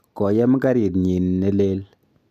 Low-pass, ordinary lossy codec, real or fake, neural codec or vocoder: 14.4 kHz; MP3, 96 kbps; real; none